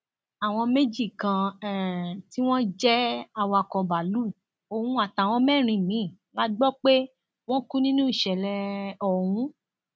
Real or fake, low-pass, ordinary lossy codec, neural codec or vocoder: real; none; none; none